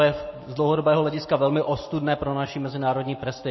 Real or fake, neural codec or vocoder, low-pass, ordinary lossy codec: real; none; 7.2 kHz; MP3, 24 kbps